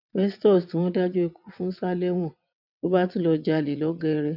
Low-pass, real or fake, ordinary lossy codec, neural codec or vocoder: 5.4 kHz; fake; none; vocoder, 22.05 kHz, 80 mel bands, WaveNeXt